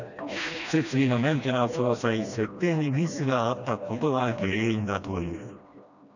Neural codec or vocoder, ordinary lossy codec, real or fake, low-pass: codec, 16 kHz, 1 kbps, FreqCodec, smaller model; none; fake; 7.2 kHz